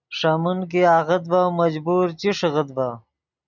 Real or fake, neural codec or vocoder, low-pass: real; none; 7.2 kHz